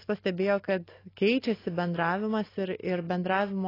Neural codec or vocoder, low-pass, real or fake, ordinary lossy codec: none; 5.4 kHz; real; AAC, 24 kbps